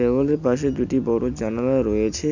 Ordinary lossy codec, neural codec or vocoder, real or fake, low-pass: none; none; real; 7.2 kHz